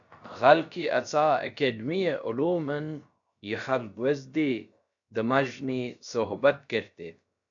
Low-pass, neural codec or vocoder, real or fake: 7.2 kHz; codec, 16 kHz, about 1 kbps, DyCAST, with the encoder's durations; fake